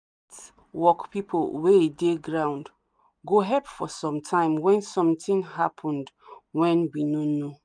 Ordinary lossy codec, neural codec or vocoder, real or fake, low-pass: none; none; real; 9.9 kHz